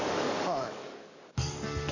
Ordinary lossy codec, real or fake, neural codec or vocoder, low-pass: none; fake; codec, 44.1 kHz, 7.8 kbps, DAC; 7.2 kHz